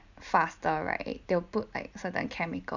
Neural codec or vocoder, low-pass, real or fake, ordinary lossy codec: none; 7.2 kHz; real; none